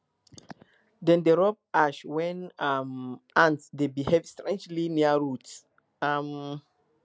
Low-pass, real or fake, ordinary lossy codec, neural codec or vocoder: none; real; none; none